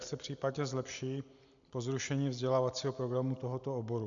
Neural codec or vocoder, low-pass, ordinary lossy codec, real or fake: none; 7.2 kHz; MP3, 96 kbps; real